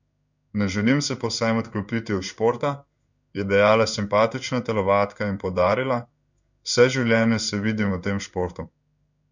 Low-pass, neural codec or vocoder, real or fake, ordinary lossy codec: 7.2 kHz; codec, 16 kHz in and 24 kHz out, 1 kbps, XY-Tokenizer; fake; none